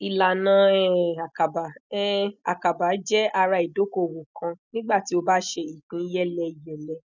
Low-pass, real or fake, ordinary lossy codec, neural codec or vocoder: 7.2 kHz; real; none; none